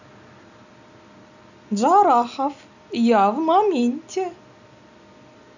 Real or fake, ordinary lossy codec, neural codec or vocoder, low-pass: real; none; none; 7.2 kHz